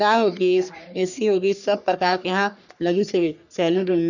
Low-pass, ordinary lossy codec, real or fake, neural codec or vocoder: 7.2 kHz; none; fake; codec, 44.1 kHz, 3.4 kbps, Pupu-Codec